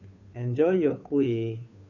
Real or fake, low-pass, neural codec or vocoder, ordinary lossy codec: fake; 7.2 kHz; codec, 16 kHz, 2 kbps, FunCodec, trained on Chinese and English, 25 frames a second; none